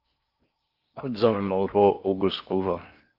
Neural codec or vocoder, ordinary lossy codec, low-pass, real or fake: codec, 16 kHz in and 24 kHz out, 0.6 kbps, FocalCodec, streaming, 4096 codes; Opus, 24 kbps; 5.4 kHz; fake